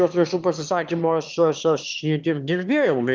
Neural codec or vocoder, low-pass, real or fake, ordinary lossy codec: autoencoder, 22.05 kHz, a latent of 192 numbers a frame, VITS, trained on one speaker; 7.2 kHz; fake; Opus, 32 kbps